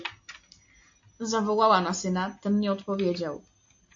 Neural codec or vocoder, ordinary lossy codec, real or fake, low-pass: none; AAC, 48 kbps; real; 7.2 kHz